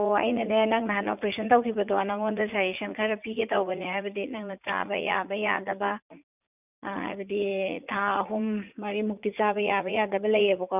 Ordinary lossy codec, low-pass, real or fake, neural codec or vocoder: none; 3.6 kHz; fake; vocoder, 44.1 kHz, 80 mel bands, Vocos